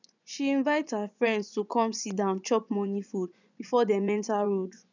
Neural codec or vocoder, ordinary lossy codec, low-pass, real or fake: vocoder, 24 kHz, 100 mel bands, Vocos; none; 7.2 kHz; fake